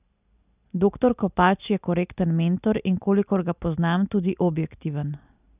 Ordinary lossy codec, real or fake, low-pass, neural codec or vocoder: none; real; 3.6 kHz; none